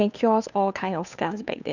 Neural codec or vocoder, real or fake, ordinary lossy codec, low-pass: codec, 16 kHz, 2 kbps, FunCodec, trained on Chinese and English, 25 frames a second; fake; none; 7.2 kHz